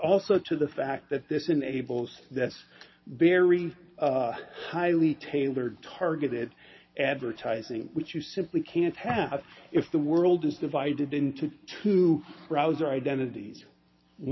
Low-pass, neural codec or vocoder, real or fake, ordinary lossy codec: 7.2 kHz; none; real; MP3, 24 kbps